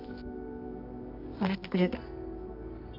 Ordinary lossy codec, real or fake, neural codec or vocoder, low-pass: none; fake; codec, 24 kHz, 0.9 kbps, WavTokenizer, medium music audio release; 5.4 kHz